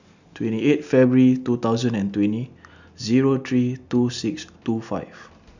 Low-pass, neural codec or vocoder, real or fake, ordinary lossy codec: 7.2 kHz; none; real; none